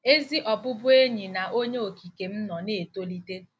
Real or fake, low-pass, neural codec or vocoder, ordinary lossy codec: real; none; none; none